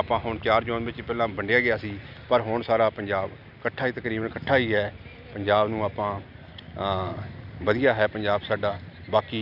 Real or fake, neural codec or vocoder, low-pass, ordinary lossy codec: real; none; 5.4 kHz; none